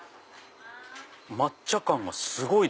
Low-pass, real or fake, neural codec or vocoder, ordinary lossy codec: none; real; none; none